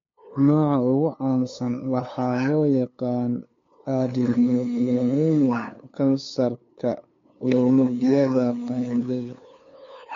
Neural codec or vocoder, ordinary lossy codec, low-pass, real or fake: codec, 16 kHz, 2 kbps, FunCodec, trained on LibriTTS, 25 frames a second; MP3, 48 kbps; 7.2 kHz; fake